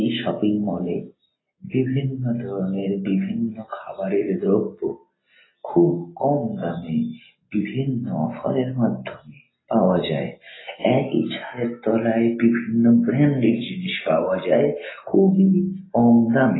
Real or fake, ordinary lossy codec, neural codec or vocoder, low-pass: real; AAC, 16 kbps; none; 7.2 kHz